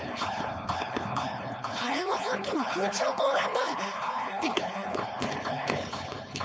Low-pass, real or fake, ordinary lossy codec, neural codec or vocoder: none; fake; none; codec, 16 kHz, 4.8 kbps, FACodec